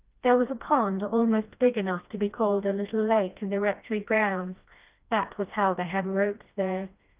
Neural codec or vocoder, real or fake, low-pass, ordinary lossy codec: codec, 16 kHz, 1 kbps, FreqCodec, smaller model; fake; 3.6 kHz; Opus, 24 kbps